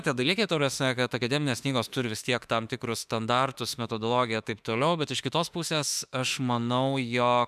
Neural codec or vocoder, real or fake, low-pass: autoencoder, 48 kHz, 32 numbers a frame, DAC-VAE, trained on Japanese speech; fake; 14.4 kHz